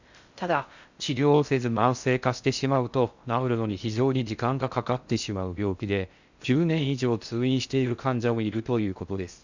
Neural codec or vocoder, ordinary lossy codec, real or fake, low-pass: codec, 16 kHz in and 24 kHz out, 0.6 kbps, FocalCodec, streaming, 4096 codes; Opus, 64 kbps; fake; 7.2 kHz